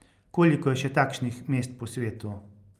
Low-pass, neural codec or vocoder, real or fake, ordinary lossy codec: 14.4 kHz; none; real; Opus, 32 kbps